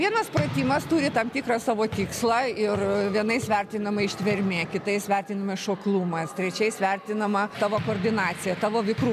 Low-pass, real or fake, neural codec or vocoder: 14.4 kHz; real; none